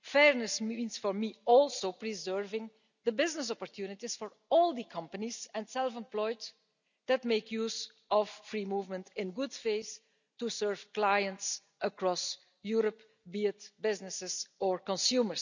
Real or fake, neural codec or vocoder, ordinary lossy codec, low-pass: real; none; none; 7.2 kHz